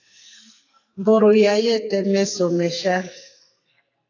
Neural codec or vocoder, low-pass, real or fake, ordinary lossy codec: codec, 44.1 kHz, 2.6 kbps, SNAC; 7.2 kHz; fake; AAC, 48 kbps